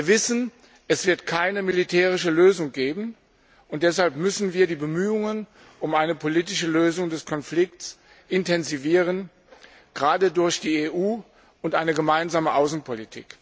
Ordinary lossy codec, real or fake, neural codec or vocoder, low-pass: none; real; none; none